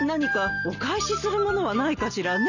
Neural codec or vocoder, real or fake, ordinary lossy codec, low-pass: none; real; none; 7.2 kHz